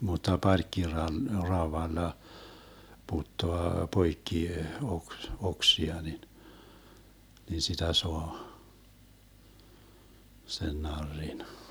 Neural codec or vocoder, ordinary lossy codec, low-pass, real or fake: none; none; none; real